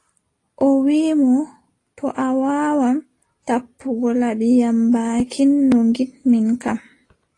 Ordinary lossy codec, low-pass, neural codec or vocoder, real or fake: AAC, 32 kbps; 10.8 kHz; none; real